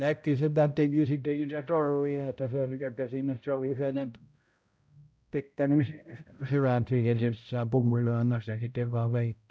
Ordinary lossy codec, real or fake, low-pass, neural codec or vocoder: none; fake; none; codec, 16 kHz, 0.5 kbps, X-Codec, HuBERT features, trained on balanced general audio